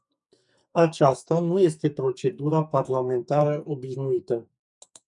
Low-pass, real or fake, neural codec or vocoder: 10.8 kHz; fake; codec, 44.1 kHz, 2.6 kbps, SNAC